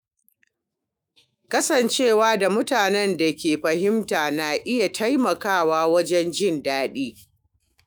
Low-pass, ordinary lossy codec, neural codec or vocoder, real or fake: none; none; autoencoder, 48 kHz, 128 numbers a frame, DAC-VAE, trained on Japanese speech; fake